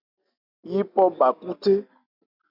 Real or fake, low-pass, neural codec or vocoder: real; 5.4 kHz; none